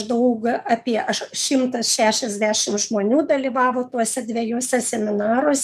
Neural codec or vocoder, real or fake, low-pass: codec, 44.1 kHz, 7.8 kbps, DAC; fake; 14.4 kHz